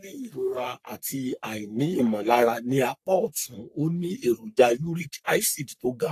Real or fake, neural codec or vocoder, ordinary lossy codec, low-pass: fake; codec, 44.1 kHz, 3.4 kbps, Pupu-Codec; AAC, 64 kbps; 14.4 kHz